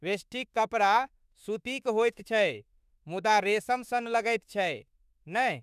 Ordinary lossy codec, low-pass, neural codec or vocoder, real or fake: none; 14.4 kHz; autoencoder, 48 kHz, 32 numbers a frame, DAC-VAE, trained on Japanese speech; fake